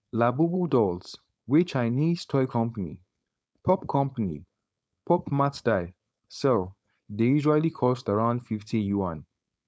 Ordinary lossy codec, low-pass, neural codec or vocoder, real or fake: none; none; codec, 16 kHz, 4.8 kbps, FACodec; fake